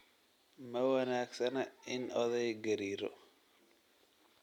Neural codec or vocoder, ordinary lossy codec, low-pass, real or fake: none; none; 19.8 kHz; real